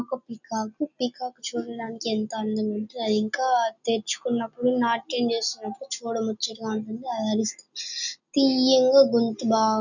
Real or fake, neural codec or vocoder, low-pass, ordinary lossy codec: real; none; 7.2 kHz; none